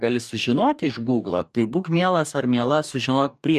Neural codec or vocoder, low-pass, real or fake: codec, 44.1 kHz, 2.6 kbps, DAC; 14.4 kHz; fake